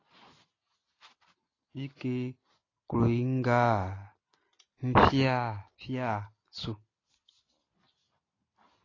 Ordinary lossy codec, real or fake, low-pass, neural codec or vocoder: AAC, 32 kbps; real; 7.2 kHz; none